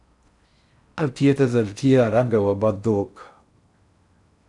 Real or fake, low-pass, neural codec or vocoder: fake; 10.8 kHz; codec, 16 kHz in and 24 kHz out, 0.6 kbps, FocalCodec, streaming, 4096 codes